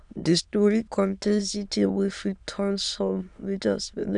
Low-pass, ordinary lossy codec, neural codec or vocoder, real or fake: 9.9 kHz; none; autoencoder, 22.05 kHz, a latent of 192 numbers a frame, VITS, trained on many speakers; fake